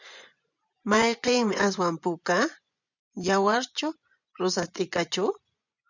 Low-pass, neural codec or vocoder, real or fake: 7.2 kHz; vocoder, 24 kHz, 100 mel bands, Vocos; fake